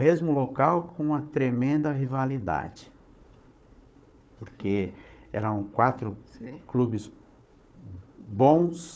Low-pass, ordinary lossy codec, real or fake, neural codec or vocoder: none; none; fake; codec, 16 kHz, 4 kbps, FunCodec, trained on Chinese and English, 50 frames a second